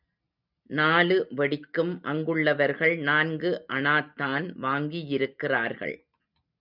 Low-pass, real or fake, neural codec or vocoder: 5.4 kHz; real; none